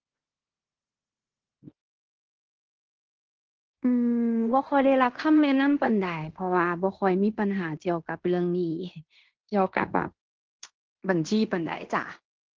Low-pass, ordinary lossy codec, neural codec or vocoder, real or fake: 7.2 kHz; Opus, 16 kbps; codec, 16 kHz in and 24 kHz out, 0.9 kbps, LongCat-Audio-Codec, fine tuned four codebook decoder; fake